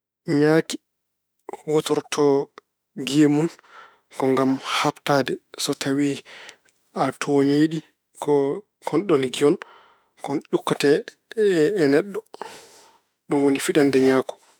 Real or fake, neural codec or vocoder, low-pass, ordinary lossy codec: fake; autoencoder, 48 kHz, 32 numbers a frame, DAC-VAE, trained on Japanese speech; none; none